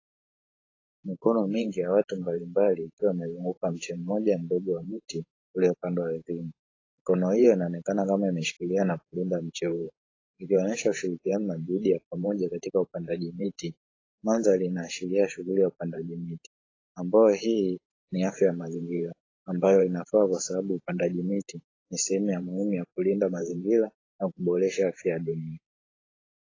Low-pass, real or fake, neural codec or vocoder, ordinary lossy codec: 7.2 kHz; fake; vocoder, 44.1 kHz, 128 mel bands every 512 samples, BigVGAN v2; AAC, 32 kbps